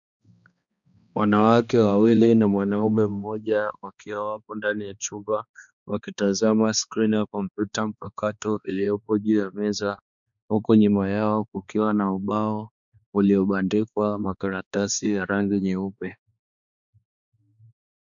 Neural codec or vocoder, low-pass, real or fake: codec, 16 kHz, 2 kbps, X-Codec, HuBERT features, trained on balanced general audio; 7.2 kHz; fake